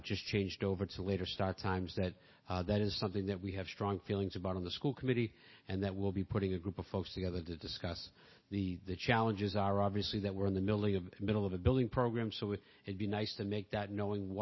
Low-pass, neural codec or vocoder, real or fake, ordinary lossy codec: 7.2 kHz; none; real; MP3, 24 kbps